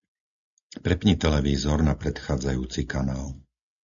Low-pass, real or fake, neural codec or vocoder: 7.2 kHz; real; none